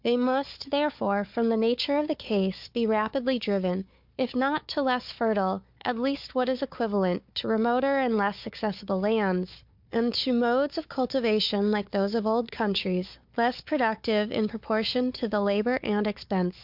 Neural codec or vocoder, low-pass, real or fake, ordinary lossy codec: codec, 16 kHz, 4 kbps, FunCodec, trained on Chinese and English, 50 frames a second; 5.4 kHz; fake; MP3, 48 kbps